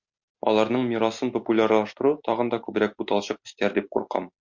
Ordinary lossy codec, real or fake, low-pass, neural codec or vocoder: MP3, 48 kbps; real; 7.2 kHz; none